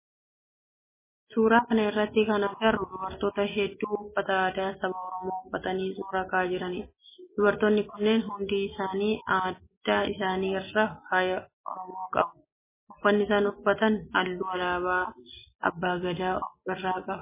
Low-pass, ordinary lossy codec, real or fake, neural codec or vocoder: 3.6 kHz; MP3, 16 kbps; real; none